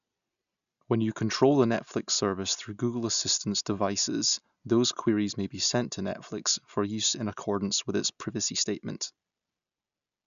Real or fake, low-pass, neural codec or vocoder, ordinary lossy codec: real; 7.2 kHz; none; none